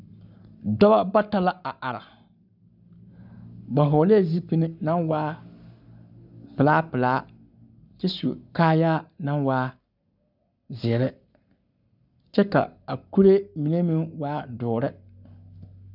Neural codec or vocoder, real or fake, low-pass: codec, 44.1 kHz, 7.8 kbps, Pupu-Codec; fake; 5.4 kHz